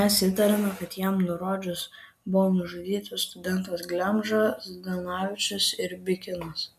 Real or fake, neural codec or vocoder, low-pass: real; none; 14.4 kHz